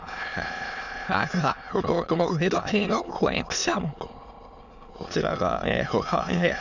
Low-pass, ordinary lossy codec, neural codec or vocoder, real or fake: 7.2 kHz; none; autoencoder, 22.05 kHz, a latent of 192 numbers a frame, VITS, trained on many speakers; fake